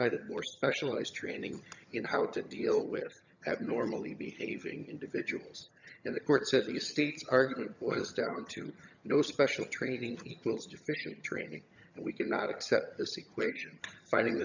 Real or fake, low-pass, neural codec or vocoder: fake; 7.2 kHz; vocoder, 22.05 kHz, 80 mel bands, HiFi-GAN